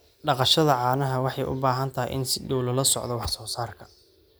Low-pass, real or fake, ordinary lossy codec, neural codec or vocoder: none; real; none; none